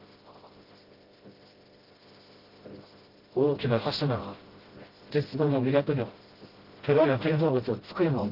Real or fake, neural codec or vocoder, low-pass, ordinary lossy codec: fake; codec, 16 kHz, 0.5 kbps, FreqCodec, smaller model; 5.4 kHz; Opus, 16 kbps